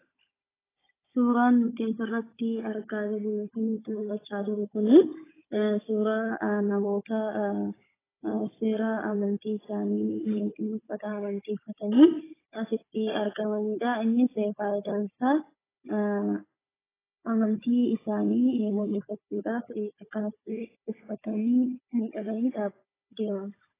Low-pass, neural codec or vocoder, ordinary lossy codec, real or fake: 3.6 kHz; codec, 16 kHz, 16 kbps, FunCodec, trained on Chinese and English, 50 frames a second; AAC, 16 kbps; fake